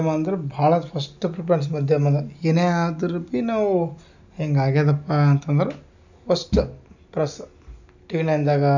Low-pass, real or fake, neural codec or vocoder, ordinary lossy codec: 7.2 kHz; real; none; none